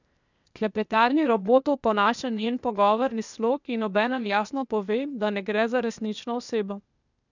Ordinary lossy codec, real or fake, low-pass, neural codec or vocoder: none; fake; 7.2 kHz; codec, 16 kHz, 0.8 kbps, ZipCodec